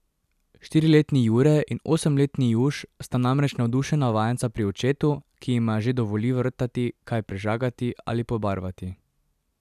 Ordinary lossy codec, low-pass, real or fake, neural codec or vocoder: none; 14.4 kHz; real; none